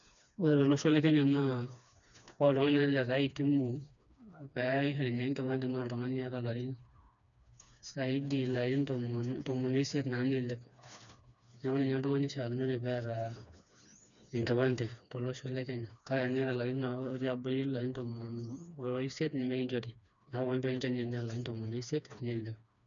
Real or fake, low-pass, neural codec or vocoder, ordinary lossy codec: fake; 7.2 kHz; codec, 16 kHz, 2 kbps, FreqCodec, smaller model; none